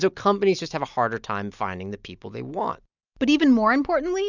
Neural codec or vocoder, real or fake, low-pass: none; real; 7.2 kHz